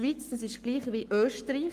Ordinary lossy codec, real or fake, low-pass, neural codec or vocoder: Opus, 32 kbps; fake; 14.4 kHz; codec, 44.1 kHz, 7.8 kbps, Pupu-Codec